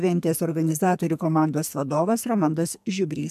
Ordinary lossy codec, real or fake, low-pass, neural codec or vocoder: MP3, 96 kbps; fake; 14.4 kHz; codec, 44.1 kHz, 2.6 kbps, SNAC